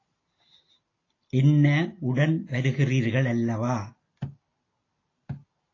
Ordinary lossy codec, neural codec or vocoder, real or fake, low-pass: AAC, 32 kbps; none; real; 7.2 kHz